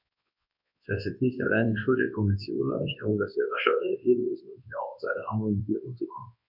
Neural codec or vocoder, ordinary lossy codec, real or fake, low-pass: codec, 24 kHz, 0.9 kbps, WavTokenizer, large speech release; MP3, 48 kbps; fake; 5.4 kHz